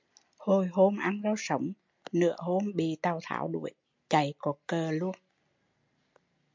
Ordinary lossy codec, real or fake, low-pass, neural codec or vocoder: MP3, 64 kbps; real; 7.2 kHz; none